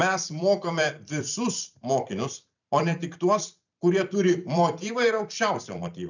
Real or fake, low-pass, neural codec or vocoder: fake; 7.2 kHz; vocoder, 44.1 kHz, 128 mel bands every 256 samples, BigVGAN v2